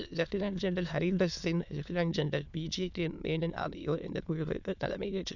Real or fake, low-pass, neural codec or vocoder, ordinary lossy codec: fake; 7.2 kHz; autoencoder, 22.05 kHz, a latent of 192 numbers a frame, VITS, trained on many speakers; none